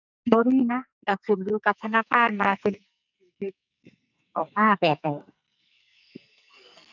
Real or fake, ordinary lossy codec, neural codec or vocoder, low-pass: fake; none; codec, 44.1 kHz, 2.6 kbps, SNAC; 7.2 kHz